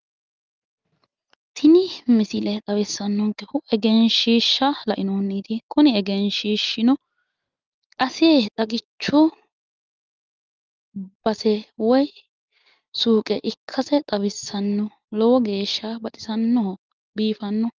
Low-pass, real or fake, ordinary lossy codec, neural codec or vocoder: 7.2 kHz; real; Opus, 32 kbps; none